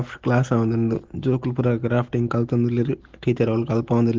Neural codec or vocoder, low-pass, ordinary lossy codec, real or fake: none; 7.2 kHz; Opus, 16 kbps; real